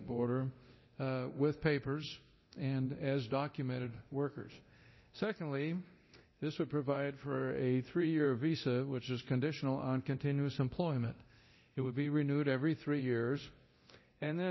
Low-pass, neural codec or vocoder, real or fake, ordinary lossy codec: 7.2 kHz; codec, 24 kHz, 0.9 kbps, DualCodec; fake; MP3, 24 kbps